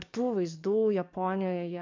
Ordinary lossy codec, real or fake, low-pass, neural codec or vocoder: MP3, 64 kbps; fake; 7.2 kHz; codec, 44.1 kHz, 7.8 kbps, DAC